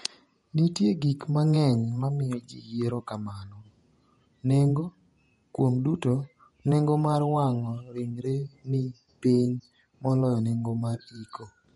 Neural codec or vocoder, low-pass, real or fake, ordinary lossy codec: vocoder, 48 kHz, 128 mel bands, Vocos; 19.8 kHz; fake; MP3, 48 kbps